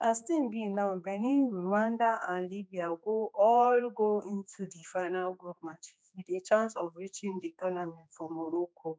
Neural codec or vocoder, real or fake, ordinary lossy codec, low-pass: codec, 16 kHz, 2 kbps, X-Codec, HuBERT features, trained on general audio; fake; none; none